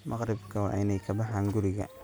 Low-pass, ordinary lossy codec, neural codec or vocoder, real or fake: none; none; none; real